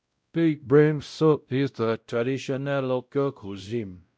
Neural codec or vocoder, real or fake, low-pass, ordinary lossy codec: codec, 16 kHz, 0.5 kbps, X-Codec, WavLM features, trained on Multilingual LibriSpeech; fake; none; none